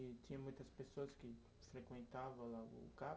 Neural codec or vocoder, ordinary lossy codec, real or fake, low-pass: none; none; real; none